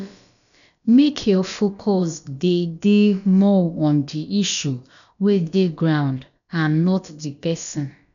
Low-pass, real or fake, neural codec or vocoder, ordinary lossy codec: 7.2 kHz; fake; codec, 16 kHz, about 1 kbps, DyCAST, with the encoder's durations; none